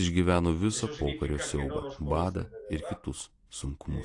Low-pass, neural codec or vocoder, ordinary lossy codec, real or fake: 10.8 kHz; none; AAC, 48 kbps; real